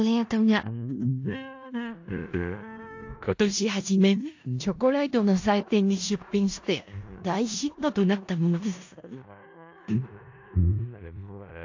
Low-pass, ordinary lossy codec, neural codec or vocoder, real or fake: 7.2 kHz; AAC, 48 kbps; codec, 16 kHz in and 24 kHz out, 0.4 kbps, LongCat-Audio-Codec, four codebook decoder; fake